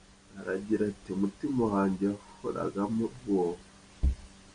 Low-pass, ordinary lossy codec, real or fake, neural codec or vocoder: 9.9 kHz; MP3, 96 kbps; real; none